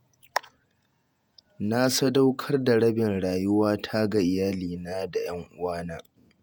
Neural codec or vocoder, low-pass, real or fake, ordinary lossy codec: vocoder, 48 kHz, 128 mel bands, Vocos; none; fake; none